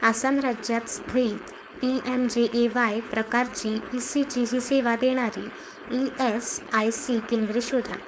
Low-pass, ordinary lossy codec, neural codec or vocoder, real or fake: none; none; codec, 16 kHz, 4.8 kbps, FACodec; fake